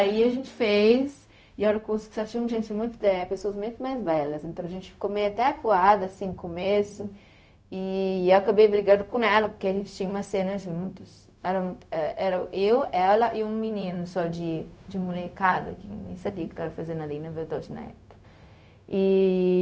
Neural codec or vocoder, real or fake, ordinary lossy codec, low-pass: codec, 16 kHz, 0.4 kbps, LongCat-Audio-Codec; fake; none; none